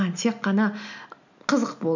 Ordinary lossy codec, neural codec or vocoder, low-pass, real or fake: none; none; 7.2 kHz; real